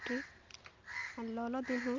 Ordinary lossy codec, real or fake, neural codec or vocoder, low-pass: Opus, 32 kbps; real; none; 7.2 kHz